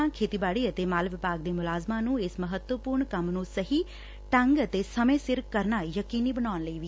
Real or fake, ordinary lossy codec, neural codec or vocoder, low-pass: real; none; none; none